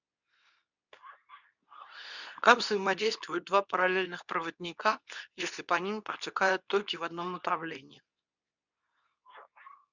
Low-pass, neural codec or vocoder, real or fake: 7.2 kHz; codec, 24 kHz, 0.9 kbps, WavTokenizer, medium speech release version 2; fake